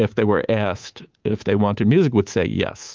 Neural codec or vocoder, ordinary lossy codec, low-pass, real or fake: codec, 24 kHz, 3.1 kbps, DualCodec; Opus, 32 kbps; 7.2 kHz; fake